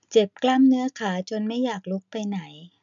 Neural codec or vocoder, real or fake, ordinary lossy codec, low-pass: none; real; none; 7.2 kHz